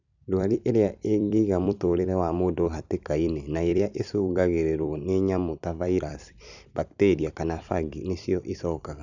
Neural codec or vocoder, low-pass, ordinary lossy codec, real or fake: vocoder, 44.1 kHz, 128 mel bands every 256 samples, BigVGAN v2; 7.2 kHz; none; fake